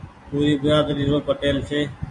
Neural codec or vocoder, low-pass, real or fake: none; 10.8 kHz; real